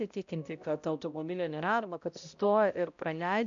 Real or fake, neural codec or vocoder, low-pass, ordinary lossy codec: fake; codec, 16 kHz, 0.5 kbps, X-Codec, HuBERT features, trained on balanced general audio; 7.2 kHz; MP3, 64 kbps